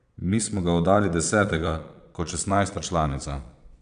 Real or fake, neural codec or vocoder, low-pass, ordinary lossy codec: fake; vocoder, 22.05 kHz, 80 mel bands, Vocos; 9.9 kHz; none